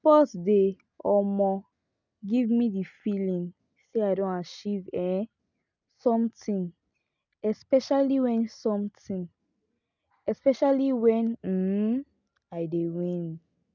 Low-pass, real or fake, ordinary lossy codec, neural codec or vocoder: 7.2 kHz; real; none; none